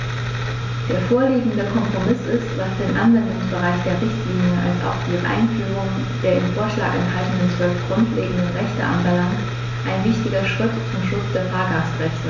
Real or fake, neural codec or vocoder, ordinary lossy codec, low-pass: real; none; MP3, 64 kbps; 7.2 kHz